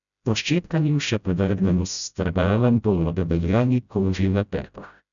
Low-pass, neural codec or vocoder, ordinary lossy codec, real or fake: 7.2 kHz; codec, 16 kHz, 0.5 kbps, FreqCodec, smaller model; none; fake